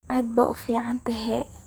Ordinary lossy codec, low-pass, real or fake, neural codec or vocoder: none; none; fake; codec, 44.1 kHz, 2.6 kbps, SNAC